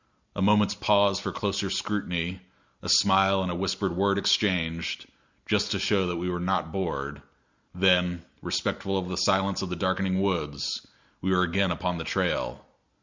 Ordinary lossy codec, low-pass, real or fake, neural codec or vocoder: Opus, 64 kbps; 7.2 kHz; real; none